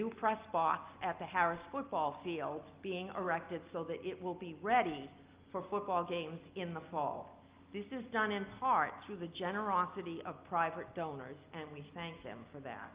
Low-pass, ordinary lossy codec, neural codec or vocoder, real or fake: 3.6 kHz; Opus, 32 kbps; none; real